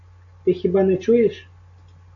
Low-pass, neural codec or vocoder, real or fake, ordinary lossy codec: 7.2 kHz; none; real; AAC, 48 kbps